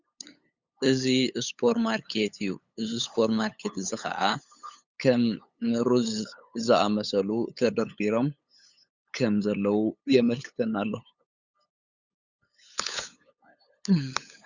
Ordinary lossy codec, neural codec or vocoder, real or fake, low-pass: Opus, 64 kbps; codec, 16 kHz, 8 kbps, FunCodec, trained on LibriTTS, 25 frames a second; fake; 7.2 kHz